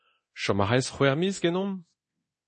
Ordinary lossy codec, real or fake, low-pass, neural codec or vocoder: MP3, 32 kbps; fake; 10.8 kHz; codec, 24 kHz, 0.9 kbps, DualCodec